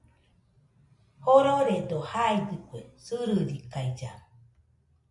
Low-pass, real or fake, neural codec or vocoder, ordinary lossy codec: 10.8 kHz; real; none; MP3, 96 kbps